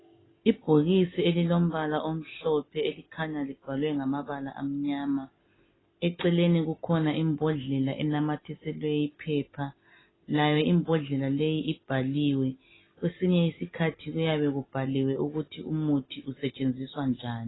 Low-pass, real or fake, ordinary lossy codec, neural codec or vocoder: 7.2 kHz; real; AAC, 16 kbps; none